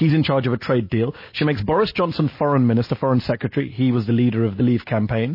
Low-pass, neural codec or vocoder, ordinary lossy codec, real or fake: 5.4 kHz; none; MP3, 24 kbps; real